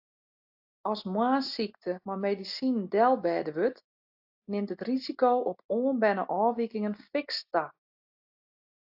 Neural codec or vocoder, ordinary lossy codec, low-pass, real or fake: none; AAC, 48 kbps; 5.4 kHz; real